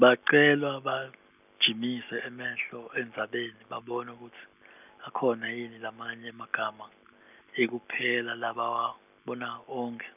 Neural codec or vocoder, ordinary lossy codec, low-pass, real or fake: none; none; 3.6 kHz; real